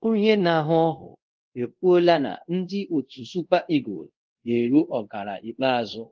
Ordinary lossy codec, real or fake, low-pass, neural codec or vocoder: Opus, 16 kbps; fake; 7.2 kHz; codec, 24 kHz, 0.5 kbps, DualCodec